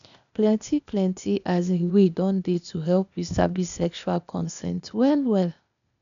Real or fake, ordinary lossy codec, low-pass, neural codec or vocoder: fake; none; 7.2 kHz; codec, 16 kHz, 0.8 kbps, ZipCodec